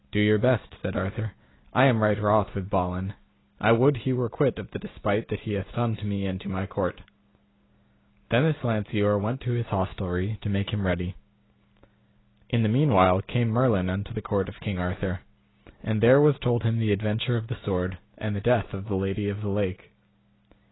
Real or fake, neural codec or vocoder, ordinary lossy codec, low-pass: real; none; AAC, 16 kbps; 7.2 kHz